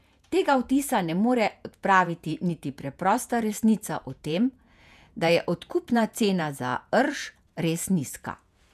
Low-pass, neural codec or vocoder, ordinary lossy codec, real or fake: 14.4 kHz; vocoder, 48 kHz, 128 mel bands, Vocos; none; fake